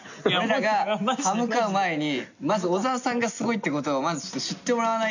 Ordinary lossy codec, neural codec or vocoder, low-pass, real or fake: none; none; 7.2 kHz; real